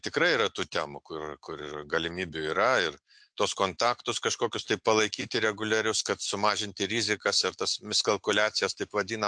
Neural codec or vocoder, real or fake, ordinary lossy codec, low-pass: none; real; MP3, 64 kbps; 9.9 kHz